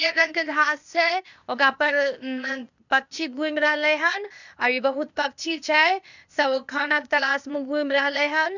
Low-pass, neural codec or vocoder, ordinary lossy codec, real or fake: 7.2 kHz; codec, 16 kHz, 0.8 kbps, ZipCodec; none; fake